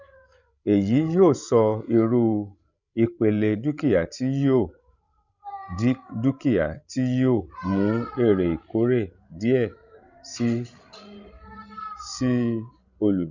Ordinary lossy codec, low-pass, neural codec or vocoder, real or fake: none; 7.2 kHz; codec, 16 kHz, 16 kbps, FreqCodec, larger model; fake